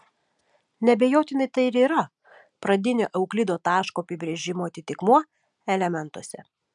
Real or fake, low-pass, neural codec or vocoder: real; 10.8 kHz; none